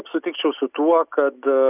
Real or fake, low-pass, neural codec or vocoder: real; 3.6 kHz; none